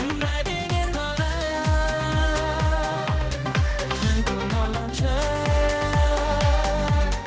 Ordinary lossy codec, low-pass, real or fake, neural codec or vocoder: none; none; fake; codec, 16 kHz, 1 kbps, X-Codec, HuBERT features, trained on general audio